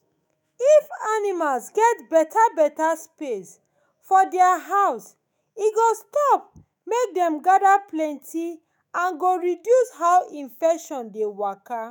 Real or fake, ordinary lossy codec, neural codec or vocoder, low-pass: fake; none; autoencoder, 48 kHz, 128 numbers a frame, DAC-VAE, trained on Japanese speech; none